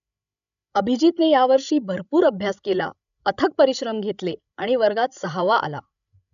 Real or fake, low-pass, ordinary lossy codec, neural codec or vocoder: fake; 7.2 kHz; none; codec, 16 kHz, 16 kbps, FreqCodec, larger model